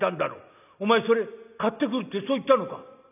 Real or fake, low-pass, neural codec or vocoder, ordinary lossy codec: real; 3.6 kHz; none; none